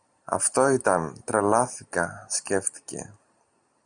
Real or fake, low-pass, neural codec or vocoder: real; 9.9 kHz; none